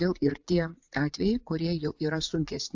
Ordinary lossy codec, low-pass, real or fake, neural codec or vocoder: AAC, 48 kbps; 7.2 kHz; fake; vocoder, 44.1 kHz, 80 mel bands, Vocos